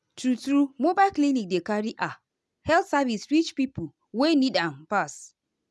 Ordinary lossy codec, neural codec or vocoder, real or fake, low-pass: none; none; real; none